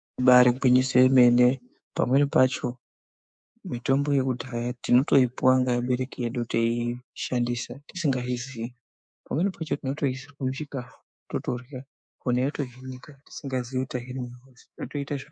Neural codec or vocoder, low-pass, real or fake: codec, 24 kHz, 3.1 kbps, DualCodec; 9.9 kHz; fake